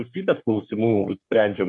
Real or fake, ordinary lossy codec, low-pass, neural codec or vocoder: fake; AAC, 64 kbps; 10.8 kHz; codec, 44.1 kHz, 3.4 kbps, Pupu-Codec